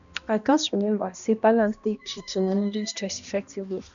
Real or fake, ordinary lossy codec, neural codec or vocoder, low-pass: fake; none; codec, 16 kHz, 1 kbps, X-Codec, HuBERT features, trained on balanced general audio; 7.2 kHz